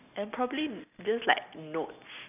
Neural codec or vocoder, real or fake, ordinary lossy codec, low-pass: none; real; none; 3.6 kHz